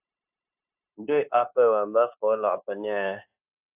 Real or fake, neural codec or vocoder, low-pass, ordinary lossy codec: fake; codec, 16 kHz, 0.9 kbps, LongCat-Audio-Codec; 3.6 kHz; AAC, 32 kbps